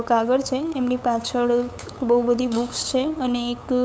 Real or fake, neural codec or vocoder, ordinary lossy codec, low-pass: fake; codec, 16 kHz, 8 kbps, FunCodec, trained on LibriTTS, 25 frames a second; none; none